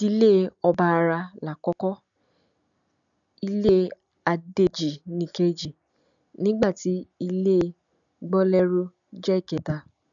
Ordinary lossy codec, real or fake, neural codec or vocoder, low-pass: none; real; none; 7.2 kHz